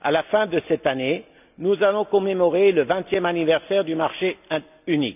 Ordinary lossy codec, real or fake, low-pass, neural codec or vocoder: none; real; 3.6 kHz; none